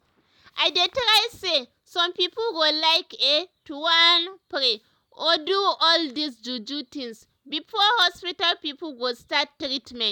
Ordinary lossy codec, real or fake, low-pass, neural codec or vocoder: none; real; 19.8 kHz; none